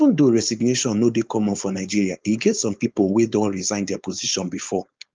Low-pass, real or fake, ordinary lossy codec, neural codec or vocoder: 7.2 kHz; fake; Opus, 24 kbps; codec, 16 kHz, 4.8 kbps, FACodec